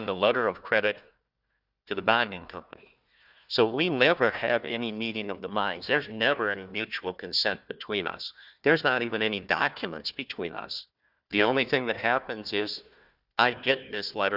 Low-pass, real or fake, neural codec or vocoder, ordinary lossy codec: 5.4 kHz; fake; codec, 16 kHz, 1 kbps, FunCodec, trained on Chinese and English, 50 frames a second; Opus, 64 kbps